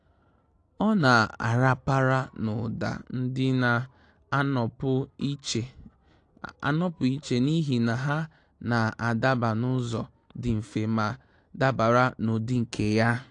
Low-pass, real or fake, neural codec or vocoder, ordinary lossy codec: 9.9 kHz; real; none; AAC, 48 kbps